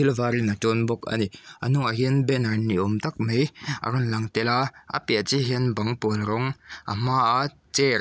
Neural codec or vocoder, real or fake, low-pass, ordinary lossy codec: none; real; none; none